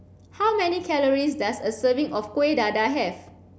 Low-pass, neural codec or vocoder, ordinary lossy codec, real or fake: none; none; none; real